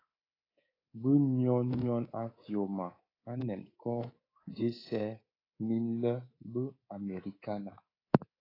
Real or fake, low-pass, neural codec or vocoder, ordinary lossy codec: fake; 5.4 kHz; codec, 24 kHz, 3.1 kbps, DualCodec; AAC, 24 kbps